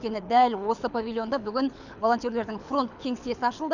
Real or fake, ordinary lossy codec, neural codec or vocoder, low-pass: fake; none; codec, 24 kHz, 6 kbps, HILCodec; 7.2 kHz